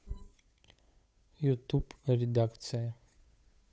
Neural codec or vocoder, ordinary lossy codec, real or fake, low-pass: codec, 16 kHz, 8 kbps, FunCodec, trained on Chinese and English, 25 frames a second; none; fake; none